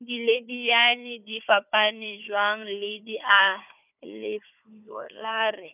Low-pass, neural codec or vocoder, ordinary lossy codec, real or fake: 3.6 kHz; codec, 16 kHz, 4 kbps, FunCodec, trained on Chinese and English, 50 frames a second; none; fake